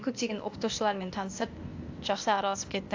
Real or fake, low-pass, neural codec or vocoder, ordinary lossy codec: fake; 7.2 kHz; codec, 16 kHz, 0.8 kbps, ZipCodec; AAC, 48 kbps